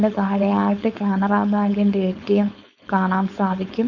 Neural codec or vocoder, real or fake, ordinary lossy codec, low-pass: codec, 16 kHz, 4.8 kbps, FACodec; fake; none; 7.2 kHz